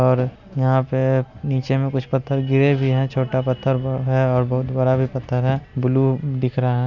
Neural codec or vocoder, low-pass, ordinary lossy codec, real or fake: none; 7.2 kHz; none; real